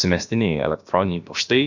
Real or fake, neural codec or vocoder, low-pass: fake; codec, 16 kHz, about 1 kbps, DyCAST, with the encoder's durations; 7.2 kHz